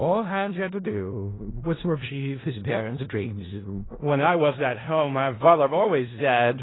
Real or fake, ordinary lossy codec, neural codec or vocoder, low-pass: fake; AAC, 16 kbps; codec, 16 kHz in and 24 kHz out, 0.4 kbps, LongCat-Audio-Codec, four codebook decoder; 7.2 kHz